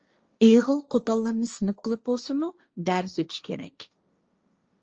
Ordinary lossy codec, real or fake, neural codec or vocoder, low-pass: Opus, 24 kbps; fake; codec, 16 kHz, 1.1 kbps, Voila-Tokenizer; 7.2 kHz